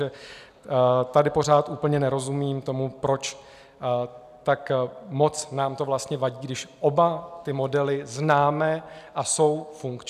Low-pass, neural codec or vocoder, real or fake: 14.4 kHz; none; real